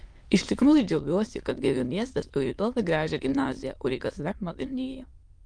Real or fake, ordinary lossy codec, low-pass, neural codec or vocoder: fake; Opus, 24 kbps; 9.9 kHz; autoencoder, 22.05 kHz, a latent of 192 numbers a frame, VITS, trained on many speakers